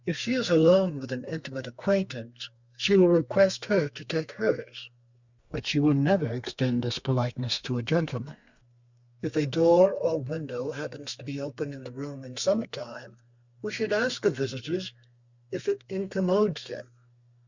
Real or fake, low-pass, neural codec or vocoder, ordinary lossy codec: fake; 7.2 kHz; codec, 32 kHz, 1.9 kbps, SNAC; Opus, 64 kbps